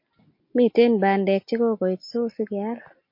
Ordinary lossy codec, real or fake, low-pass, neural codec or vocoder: MP3, 32 kbps; real; 5.4 kHz; none